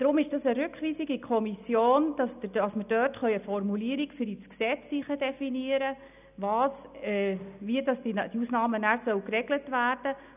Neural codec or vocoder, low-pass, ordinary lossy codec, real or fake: none; 3.6 kHz; none; real